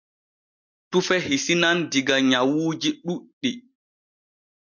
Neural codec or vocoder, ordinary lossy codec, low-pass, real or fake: none; MP3, 48 kbps; 7.2 kHz; real